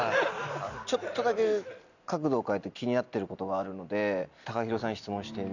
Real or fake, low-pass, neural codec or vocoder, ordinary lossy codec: real; 7.2 kHz; none; none